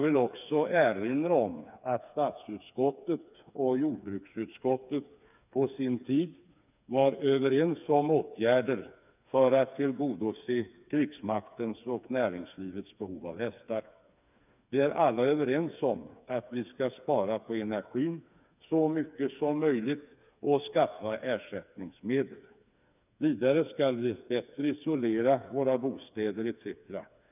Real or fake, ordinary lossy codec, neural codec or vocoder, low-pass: fake; none; codec, 16 kHz, 4 kbps, FreqCodec, smaller model; 3.6 kHz